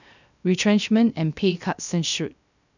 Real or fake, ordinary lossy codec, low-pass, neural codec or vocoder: fake; none; 7.2 kHz; codec, 16 kHz, 0.3 kbps, FocalCodec